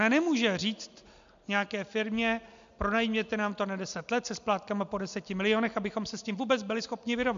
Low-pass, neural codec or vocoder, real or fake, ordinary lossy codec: 7.2 kHz; none; real; MP3, 64 kbps